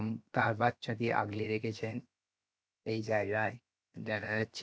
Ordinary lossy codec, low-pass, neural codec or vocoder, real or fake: none; none; codec, 16 kHz, 0.7 kbps, FocalCodec; fake